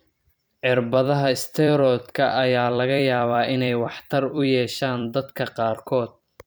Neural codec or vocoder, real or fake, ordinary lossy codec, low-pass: vocoder, 44.1 kHz, 128 mel bands every 256 samples, BigVGAN v2; fake; none; none